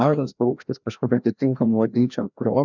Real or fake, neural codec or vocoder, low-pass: fake; codec, 16 kHz, 1 kbps, FreqCodec, larger model; 7.2 kHz